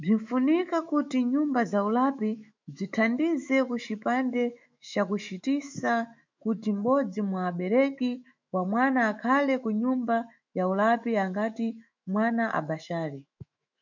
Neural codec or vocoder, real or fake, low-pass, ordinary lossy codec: autoencoder, 48 kHz, 128 numbers a frame, DAC-VAE, trained on Japanese speech; fake; 7.2 kHz; MP3, 64 kbps